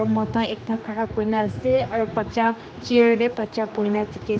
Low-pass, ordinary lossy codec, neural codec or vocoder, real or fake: none; none; codec, 16 kHz, 2 kbps, X-Codec, HuBERT features, trained on general audio; fake